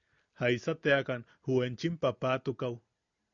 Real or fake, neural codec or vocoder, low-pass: real; none; 7.2 kHz